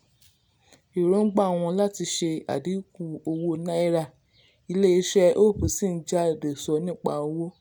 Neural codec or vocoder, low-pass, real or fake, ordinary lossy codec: none; none; real; none